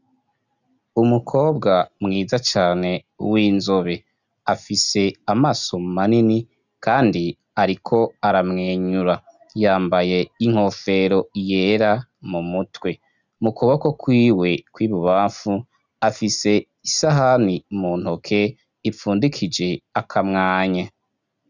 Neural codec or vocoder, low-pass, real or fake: none; 7.2 kHz; real